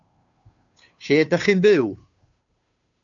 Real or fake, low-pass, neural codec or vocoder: fake; 7.2 kHz; codec, 16 kHz, 2 kbps, FunCodec, trained on Chinese and English, 25 frames a second